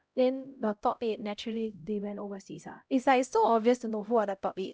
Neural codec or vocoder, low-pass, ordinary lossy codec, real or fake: codec, 16 kHz, 0.5 kbps, X-Codec, HuBERT features, trained on LibriSpeech; none; none; fake